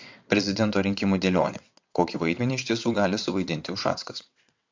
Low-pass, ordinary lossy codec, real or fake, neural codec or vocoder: 7.2 kHz; MP3, 48 kbps; fake; vocoder, 22.05 kHz, 80 mel bands, WaveNeXt